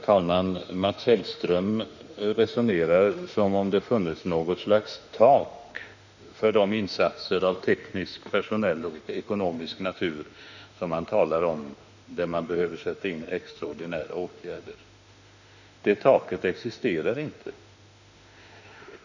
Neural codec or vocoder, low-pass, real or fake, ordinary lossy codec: autoencoder, 48 kHz, 32 numbers a frame, DAC-VAE, trained on Japanese speech; 7.2 kHz; fake; none